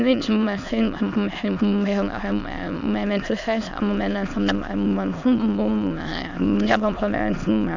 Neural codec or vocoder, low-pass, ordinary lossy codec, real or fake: autoencoder, 22.05 kHz, a latent of 192 numbers a frame, VITS, trained on many speakers; 7.2 kHz; none; fake